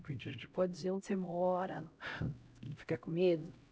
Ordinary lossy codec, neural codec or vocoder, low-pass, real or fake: none; codec, 16 kHz, 0.5 kbps, X-Codec, HuBERT features, trained on LibriSpeech; none; fake